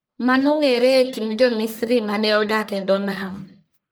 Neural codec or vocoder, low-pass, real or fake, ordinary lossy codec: codec, 44.1 kHz, 1.7 kbps, Pupu-Codec; none; fake; none